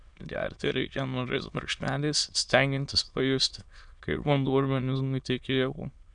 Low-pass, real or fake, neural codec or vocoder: 9.9 kHz; fake; autoencoder, 22.05 kHz, a latent of 192 numbers a frame, VITS, trained on many speakers